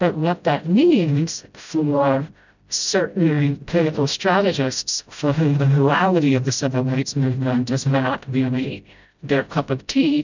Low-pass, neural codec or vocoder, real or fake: 7.2 kHz; codec, 16 kHz, 0.5 kbps, FreqCodec, smaller model; fake